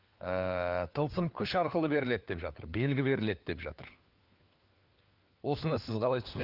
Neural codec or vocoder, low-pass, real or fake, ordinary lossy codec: codec, 16 kHz, 4 kbps, FunCodec, trained on LibriTTS, 50 frames a second; 5.4 kHz; fake; Opus, 24 kbps